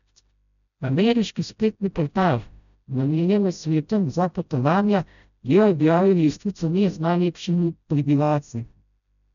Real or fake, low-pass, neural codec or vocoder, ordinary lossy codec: fake; 7.2 kHz; codec, 16 kHz, 0.5 kbps, FreqCodec, smaller model; none